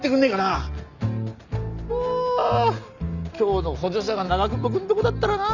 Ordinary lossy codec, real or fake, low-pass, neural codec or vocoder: none; real; 7.2 kHz; none